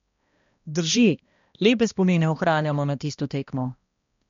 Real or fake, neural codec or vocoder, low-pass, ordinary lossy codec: fake; codec, 16 kHz, 1 kbps, X-Codec, HuBERT features, trained on balanced general audio; 7.2 kHz; MP3, 48 kbps